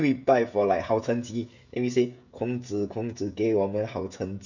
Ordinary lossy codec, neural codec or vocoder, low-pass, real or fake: none; codec, 16 kHz, 16 kbps, FreqCodec, smaller model; 7.2 kHz; fake